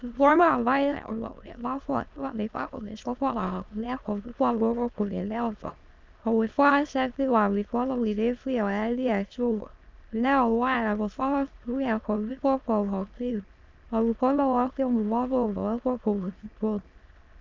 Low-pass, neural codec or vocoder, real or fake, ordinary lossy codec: 7.2 kHz; autoencoder, 22.05 kHz, a latent of 192 numbers a frame, VITS, trained on many speakers; fake; Opus, 24 kbps